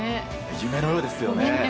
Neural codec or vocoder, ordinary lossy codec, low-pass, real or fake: none; none; none; real